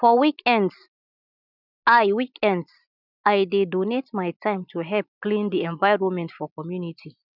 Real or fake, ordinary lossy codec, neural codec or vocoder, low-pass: real; none; none; 5.4 kHz